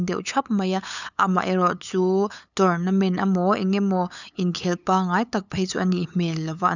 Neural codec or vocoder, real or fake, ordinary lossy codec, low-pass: codec, 16 kHz, 8 kbps, FunCodec, trained on LibriTTS, 25 frames a second; fake; none; 7.2 kHz